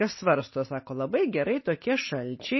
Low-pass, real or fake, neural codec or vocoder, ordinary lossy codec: 7.2 kHz; real; none; MP3, 24 kbps